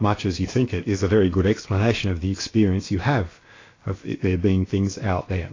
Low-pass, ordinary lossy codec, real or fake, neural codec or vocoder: 7.2 kHz; AAC, 32 kbps; fake; codec, 16 kHz, about 1 kbps, DyCAST, with the encoder's durations